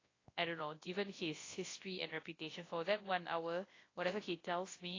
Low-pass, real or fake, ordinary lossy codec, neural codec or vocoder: 7.2 kHz; fake; AAC, 32 kbps; codec, 24 kHz, 0.9 kbps, WavTokenizer, large speech release